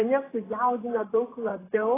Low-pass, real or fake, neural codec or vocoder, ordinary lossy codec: 3.6 kHz; fake; vocoder, 44.1 kHz, 128 mel bands, Pupu-Vocoder; AAC, 16 kbps